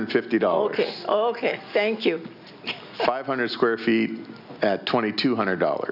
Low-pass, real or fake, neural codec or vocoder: 5.4 kHz; real; none